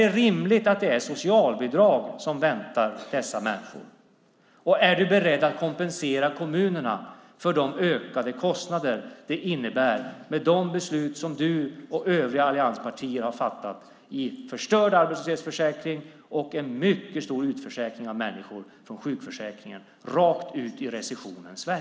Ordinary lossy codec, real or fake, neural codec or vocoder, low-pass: none; real; none; none